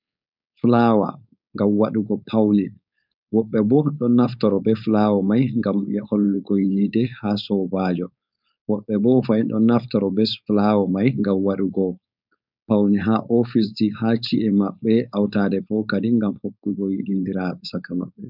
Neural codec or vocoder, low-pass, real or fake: codec, 16 kHz, 4.8 kbps, FACodec; 5.4 kHz; fake